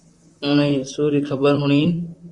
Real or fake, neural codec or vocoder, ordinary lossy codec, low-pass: fake; vocoder, 44.1 kHz, 128 mel bands, Pupu-Vocoder; Opus, 64 kbps; 10.8 kHz